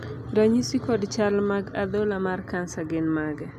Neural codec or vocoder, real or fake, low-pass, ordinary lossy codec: none; real; 14.4 kHz; AAC, 96 kbps